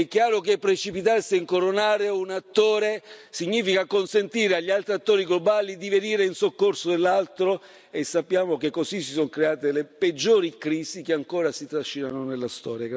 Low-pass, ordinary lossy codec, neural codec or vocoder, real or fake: none; none; none; real